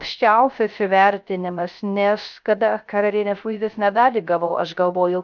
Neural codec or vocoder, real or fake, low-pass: codec, 16 kHz, 0.3 kbps, FocalCodec; fake; 7.2 kHz